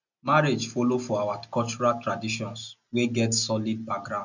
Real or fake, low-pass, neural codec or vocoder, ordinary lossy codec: real; 7.2 kHz; none; none